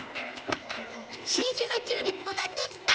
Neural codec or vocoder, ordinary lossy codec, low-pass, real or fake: codec, 16 kHz, 0.8 kbps, ZipCodec; none; none; fake